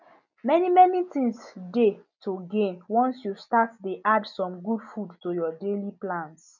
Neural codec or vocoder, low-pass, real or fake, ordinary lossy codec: none; 7.2 kHz; real; none